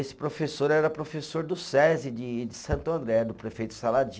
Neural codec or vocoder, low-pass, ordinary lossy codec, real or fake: none; none; none; real